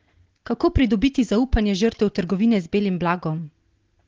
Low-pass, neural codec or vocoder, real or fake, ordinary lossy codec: 7.2 kHz; none; real; Opus, 16 kbps